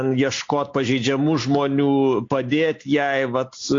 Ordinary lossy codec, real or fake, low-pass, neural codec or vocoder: AAC, 48 kbps; real; 7.2 kHz; none